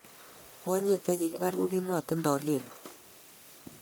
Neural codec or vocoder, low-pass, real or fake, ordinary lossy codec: codec, 44.1 kHz, 1.7 kbps, Pupu-Codec; none; fake; none